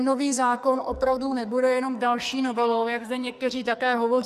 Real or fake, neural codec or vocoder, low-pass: fake; codec, 44.1 kHz, 2.6 kbps, SNAC; 14.4 kHz